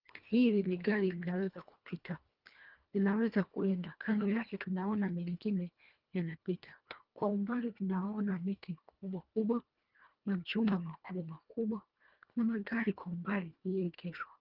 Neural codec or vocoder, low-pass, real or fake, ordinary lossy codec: codec, 24 kHz, 1.5 kbps, HILCodec; 5.4 kHz; fake; Opus, 24 kbps